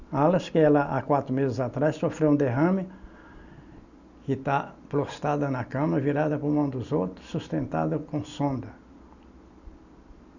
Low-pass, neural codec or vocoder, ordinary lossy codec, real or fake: 7.2 kHz; none; none; real